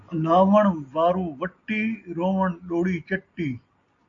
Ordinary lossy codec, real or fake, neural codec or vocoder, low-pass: AAC, 64 kbps; real; none; 7.2 kHz